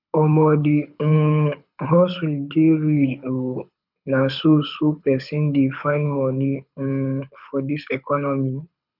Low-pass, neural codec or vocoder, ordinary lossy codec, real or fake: 5.4 kHz; codec, 24 kHz, 6 kbps, HILCodec; none; fake